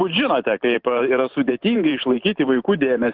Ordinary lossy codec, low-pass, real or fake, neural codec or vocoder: Opus, 24 kbps; 5.4 kHz; fake; vocoder, 44.1 kHz, 128 mel bands every 512 samples, BigVGAN v2